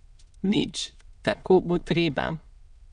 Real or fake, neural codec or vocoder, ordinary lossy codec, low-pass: fake; autoencoder, 22.05 kHz, a latent of 192 numbers a frame, VITS, trained on many speakers; none; 9.9 kHz